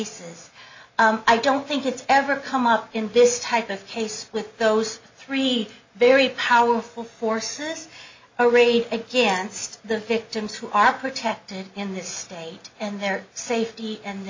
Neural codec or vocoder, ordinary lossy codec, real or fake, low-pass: none; MP3, 48 kbps; real; 7.2 kHz